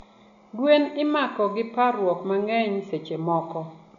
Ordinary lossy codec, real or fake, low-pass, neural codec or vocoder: none; real; 7.2 kHz; none